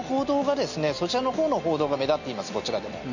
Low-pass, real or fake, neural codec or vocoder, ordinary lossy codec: 7.2 kHz; real; none; none